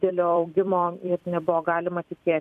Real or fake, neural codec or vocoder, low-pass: fake; vocoder, 44.1 kHz, 128 mel bands every 256 samples, BigVGAN v2; 14.4 kHz